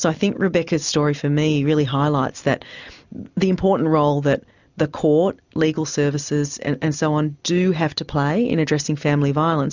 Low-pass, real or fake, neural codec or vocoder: 7.2 kHz; real; none